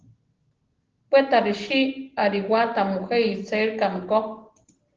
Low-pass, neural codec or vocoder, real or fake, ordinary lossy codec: 7.2 kHz; none; real; Opus, 16 kbps